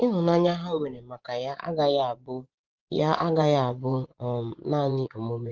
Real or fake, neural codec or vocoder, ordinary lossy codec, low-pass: fake; vocoder, 24 kHz, 100 mel bands, Vocos; Opus, 16 kbps; 7.2 kHz